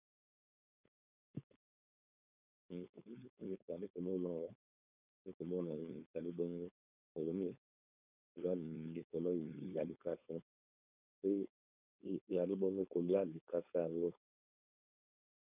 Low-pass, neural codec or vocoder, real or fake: 3.6 kHz; codec, 16 kHz, 4.8 kbps, FACodec; fake